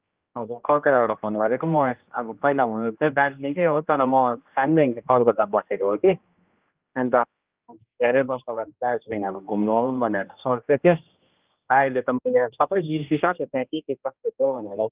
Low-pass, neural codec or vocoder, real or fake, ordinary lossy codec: 3.6 kHz; codec, 16 kHz, 1 kbps, X-Codec, HuBERT features, trained on general audio; fake; Opus, 24 kbps